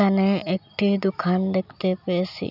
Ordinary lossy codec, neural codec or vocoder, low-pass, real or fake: none; none; 5.4 kHz; real